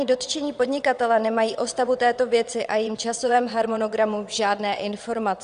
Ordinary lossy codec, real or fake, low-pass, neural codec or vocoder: Opus, 64 kbps; fake; 9.9 kHz; vocoder, 22.05 kHz, 80 mel bands, WaveNeXt